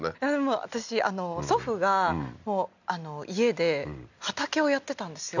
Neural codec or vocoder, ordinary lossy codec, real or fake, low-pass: none; none; real; 7.2 kHz